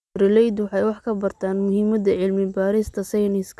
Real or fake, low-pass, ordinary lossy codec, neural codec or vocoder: real; none; none; none